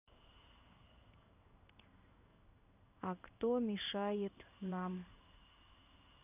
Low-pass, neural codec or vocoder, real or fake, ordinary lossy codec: 3.6 kHz; codec, 16 kHz in and 24 kHz out, 1 kbps, XY-Tokenizer; fake; none